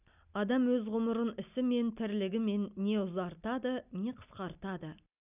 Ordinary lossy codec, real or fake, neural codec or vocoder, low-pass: none; real; none; 3.6 kHz